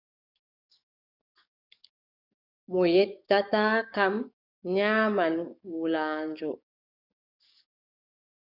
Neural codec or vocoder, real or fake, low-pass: codec, 44.1 kHz, 7.8 kbps, DAC; fake; 5.4 kHz